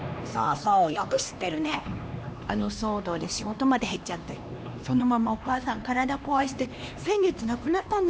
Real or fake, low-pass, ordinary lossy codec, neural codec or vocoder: fake; none; none; codec, 16 kHz, 2 kbps, X-Codec, HuBERT features, trained on LibriSpeech